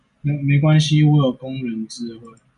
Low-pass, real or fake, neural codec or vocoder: 10.8 kHz; real; none